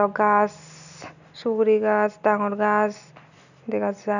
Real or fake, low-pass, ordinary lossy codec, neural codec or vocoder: real; 7.2 kHz; none; none